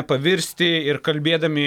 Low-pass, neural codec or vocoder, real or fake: 19.8 kHz; none; real